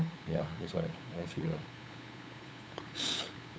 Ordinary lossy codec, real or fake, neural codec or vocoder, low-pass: none; fake; codec, 16 kHz, 8 kbps, FunCodec, trained on LibriTTS, 25 frames a second; none